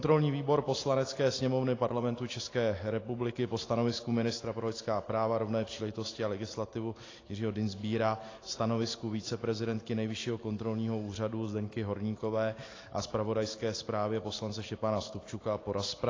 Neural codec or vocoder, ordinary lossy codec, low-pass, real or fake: none; AAC, 32 kbps; 7.2 kHz; real